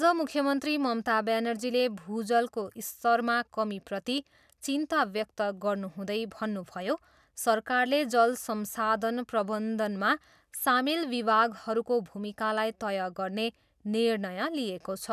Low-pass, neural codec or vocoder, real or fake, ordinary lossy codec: 14.4 kHz; none; real; none